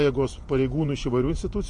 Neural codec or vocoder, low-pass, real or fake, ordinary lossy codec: vocoder, 48 kHz, 128 mel bands, Vocos; 10.8 kHz; fake; MP3, 48 kbps